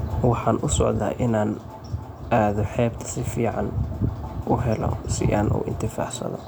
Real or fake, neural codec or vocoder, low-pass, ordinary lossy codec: real; none; none; none